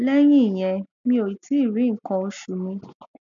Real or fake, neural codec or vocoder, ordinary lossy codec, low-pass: real; none; none; 7.2 kHz